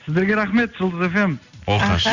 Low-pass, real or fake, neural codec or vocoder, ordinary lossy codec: 7.2 kHz; real; none; none